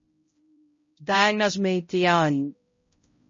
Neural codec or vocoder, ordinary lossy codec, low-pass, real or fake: codec, 16 kHz, 0.5 kbps, X-Codec, HuBERT features, trained on balanced general audio; MP3, 32 kbps; 7.2 kHz; fake